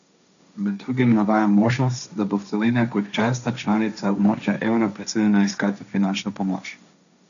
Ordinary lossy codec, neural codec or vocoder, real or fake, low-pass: none; codec, 16 kHz, 1.1 kbps, Voila-Tokenizer; fake; 7.2 kHz